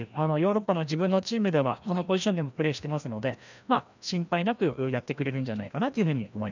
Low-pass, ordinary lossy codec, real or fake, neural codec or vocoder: 7.2 kHz; none; fake; codec, 16 kHz, 1 kbps, FreqCodec, larger model